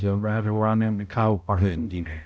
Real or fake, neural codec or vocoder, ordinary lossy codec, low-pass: fake; codec, 16 kHz, 0.5 kbps, X-Codec, HuBERT features, trained on balanced general audio; none; none